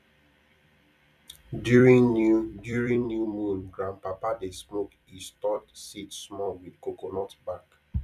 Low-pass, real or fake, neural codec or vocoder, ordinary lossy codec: 14.4 kHz; fake; vocoder, 44.1 kHz, 128 mel bands every 256 samples, BigVGAN v2; none